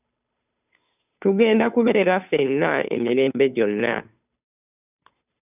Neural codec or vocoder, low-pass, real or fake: codec, 16 kHz, 2 kbps, FunCodec, trained on Chinese and English, 25 frames a second; 3.6 kHz; fake